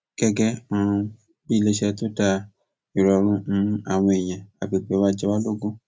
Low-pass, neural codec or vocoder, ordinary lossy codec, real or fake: none; none; none; real